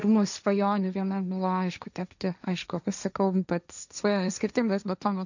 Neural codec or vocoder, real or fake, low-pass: codec, 16 kHz, 1.1 kbps, Voila-Tokenizer; fake; 7.2 kHz